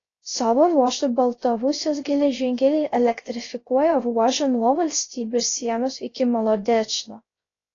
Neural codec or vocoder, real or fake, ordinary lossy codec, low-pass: codec, 16 kHz, 0.3 kbps, FocalCodec; fake; AAC, 32 kbps; 7.2 kHz